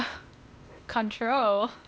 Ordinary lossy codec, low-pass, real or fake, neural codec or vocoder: none; none; fake; codec, 16 kHz, 0.8 kbps, ZipCodec